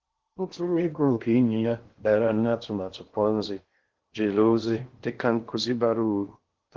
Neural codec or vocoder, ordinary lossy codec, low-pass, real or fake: codec, 16 kHz in and 24 kHz out, 0.6 kbps, FocalCodec, streaming, 2048 codes; Opus, 16 kbps; 7.2 kHz; fake